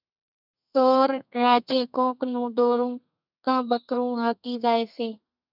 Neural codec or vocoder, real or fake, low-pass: codec, 32 kHz, 1.9 kbps, SNAC; fake; 5.4 kHz